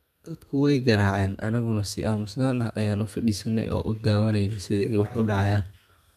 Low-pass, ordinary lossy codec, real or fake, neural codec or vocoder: 14.4 kHz; none; fake; codec, 32 kHz, 1.9 kbps, SNAC